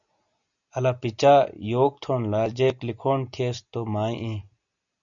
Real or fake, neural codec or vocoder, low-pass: real; none; 7.2 kHz